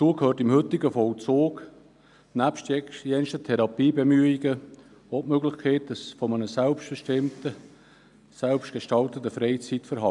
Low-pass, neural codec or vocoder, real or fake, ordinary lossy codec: 10.8 kHz; none; real; none